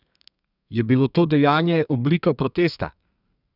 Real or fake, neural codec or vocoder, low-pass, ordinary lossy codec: fake; codec, 32 kHz, 1.9 kbps, SNAC; 5.4 kHz; none